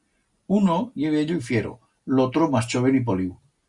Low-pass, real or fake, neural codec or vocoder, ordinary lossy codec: 10.8 kHz; real; none; Opus, 64 kbps